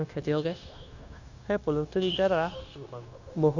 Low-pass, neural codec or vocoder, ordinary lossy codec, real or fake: 7.2 kHz; codec, 16 kHz, 0.9 kbps, LongCat-Audio-Codec; none; fake